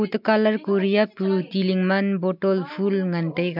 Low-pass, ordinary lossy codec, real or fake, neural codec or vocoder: 5.4 kHz; MP3, 48 kbps; real; none